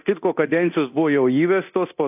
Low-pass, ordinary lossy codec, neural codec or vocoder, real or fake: 3.6 kHz; AAC, 32 kbps; codec, 24 kHz, 0.9 kbps, DualCodec; fake